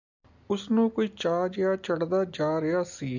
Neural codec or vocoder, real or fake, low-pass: none; real; 7.2 kHz